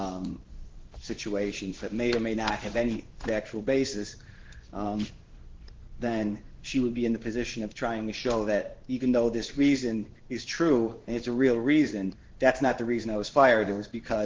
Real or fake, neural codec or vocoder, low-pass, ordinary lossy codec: fake; codec, 16 kHz in and 24 kHz out, 1 kbps, XY-Tokenizer; 7.2 kHz; Opus, 16 kbps